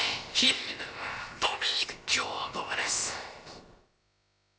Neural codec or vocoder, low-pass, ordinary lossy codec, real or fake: codec, 16 kHz, about 1 kbps, DyCAST, with the encoder's durations; none; none; fake